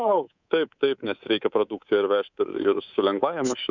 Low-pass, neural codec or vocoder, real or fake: 7.2 kHz; codec, 24 kHz, 3.1 kbps, DualCodec; fake